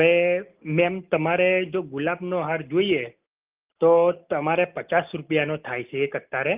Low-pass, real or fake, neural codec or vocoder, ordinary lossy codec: 3.6 kHz; real; none; Opus, 32 kbps